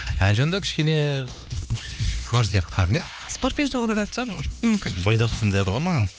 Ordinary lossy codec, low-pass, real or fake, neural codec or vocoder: none; none; fake; codec, 16 kHz, 2 kbps, X-Codec, HuBERT features, trained on LibriSpeech